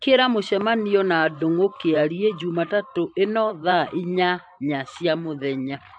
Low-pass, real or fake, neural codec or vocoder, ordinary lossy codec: 9.9 kHz; fake; vocoder, 44.1 kHz, 128 mel bands every 512 samples, BigVGAN v2; AAC, 64 kbps